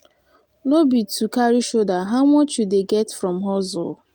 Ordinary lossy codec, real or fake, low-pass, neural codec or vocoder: Opus, 32 kbps; real; 19.8 kHz; none